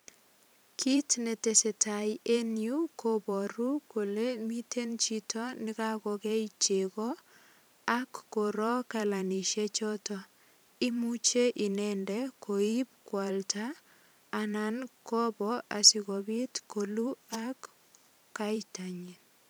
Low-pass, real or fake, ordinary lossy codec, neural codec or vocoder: none; fake; none; vocoder, 44.1 kHz, 128 mel bands every 512 samples, BigVGAN v2